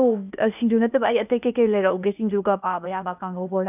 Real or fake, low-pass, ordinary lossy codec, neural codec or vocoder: fake; 3.6 kHz; none; codec, 16 kHz, 0.8 kbps, ZipCodec